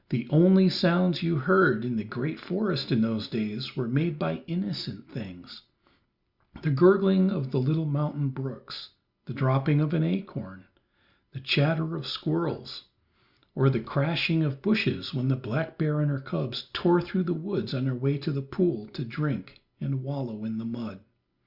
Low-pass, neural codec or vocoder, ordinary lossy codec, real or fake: 5.4 kHz; none; Opus, 64 kbps; real